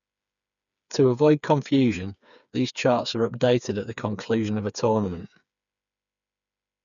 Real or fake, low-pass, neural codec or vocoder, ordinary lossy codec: fake; 7.2 kHz; codec, 16 kHz, 4 kbps, FreqCodec, smaller model; none